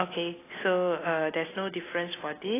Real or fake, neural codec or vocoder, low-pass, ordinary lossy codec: real; none; 3.6 kHz; AAC, 16 kbps